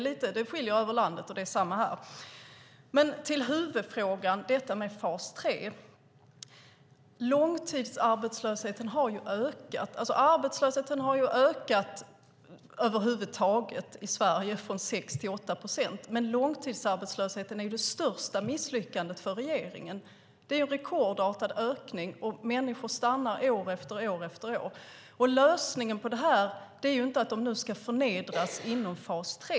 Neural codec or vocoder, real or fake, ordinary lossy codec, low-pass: none; real; none; none